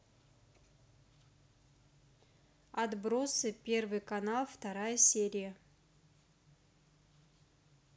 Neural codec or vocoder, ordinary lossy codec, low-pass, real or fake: none; none; none; real